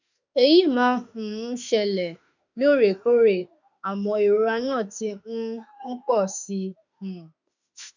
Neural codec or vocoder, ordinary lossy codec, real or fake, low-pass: autoencoder, 48 kHz, 32 numbers a frame, DAC-VAE, trained on Japanese speech; none; fake; 7.2 kHz